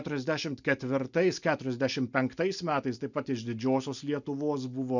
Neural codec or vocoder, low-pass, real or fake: none; 7.2 kHz; real